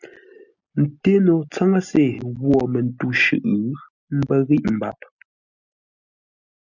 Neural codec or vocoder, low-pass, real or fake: none; 7.2 kHz; real